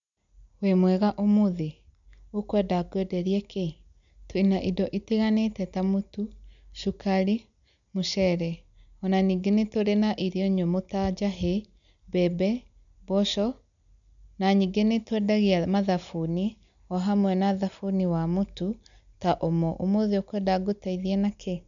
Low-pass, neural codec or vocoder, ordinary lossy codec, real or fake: 7.2 kHz; none; none; real